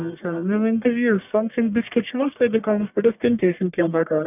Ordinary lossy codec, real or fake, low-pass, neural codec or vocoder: none; fake; 3.6 kHz; codec, 44.1 kHz, 1.7 kbps, Pupu-Codec